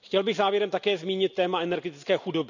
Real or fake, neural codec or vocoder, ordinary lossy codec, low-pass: real; none; none; 7.2 kHz